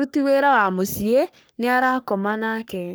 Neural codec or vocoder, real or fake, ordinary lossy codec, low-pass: codec, 44.1 kHz, 3.4 kbps, Pupu-Codec; fake; none; none